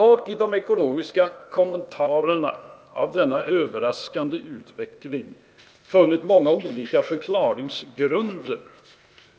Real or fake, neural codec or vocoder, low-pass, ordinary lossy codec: fake; codec, 16 kHz, 0.8 kbps, ZipCodec; none; none